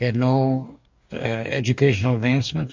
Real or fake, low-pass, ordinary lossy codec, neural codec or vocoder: fake; 7.2 kHz; MP3, 64 kbps; codec, 44.1 kHz, 2.6 kbps, DAC